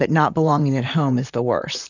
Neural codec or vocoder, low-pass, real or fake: vocoder, 44.1 kHz, 128 mel bands, Pupu-Vocoder; 7.2 kHz; fake